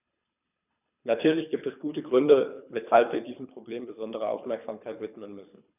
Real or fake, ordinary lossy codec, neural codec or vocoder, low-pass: fake; none; codec, 24 kHz, 3 kbps, HILCodec; 3.6 kHz